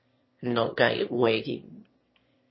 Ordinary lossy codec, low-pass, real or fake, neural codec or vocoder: MP3, 24 kbps; 7.2 kHz; fake; autoencoder, 22.05 kHz, a latent of 192 numbers a frame, VITS, trained on one speaker